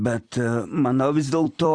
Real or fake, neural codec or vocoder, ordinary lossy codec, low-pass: fake; vocoder, 44.1 kHz, 128 mel bands every 256 samples, BigVGAN v2; Opus, 64 kbps; 9.9 kHz